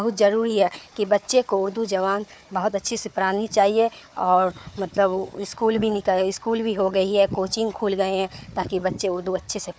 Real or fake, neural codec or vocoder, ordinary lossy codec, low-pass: fake; codec, 16 kHz, 4 kbps, FunCodec, trained on Chinese and English, 50 frames a second; none; none